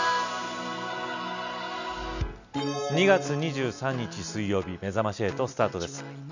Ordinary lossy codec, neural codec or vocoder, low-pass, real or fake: none; none; 7.2 kHz; real